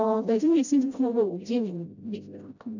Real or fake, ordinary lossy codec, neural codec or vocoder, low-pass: fake; none; codec, 16 kHz, 0.5 kbps, FreqCodec, smaller model; 7.2 kHz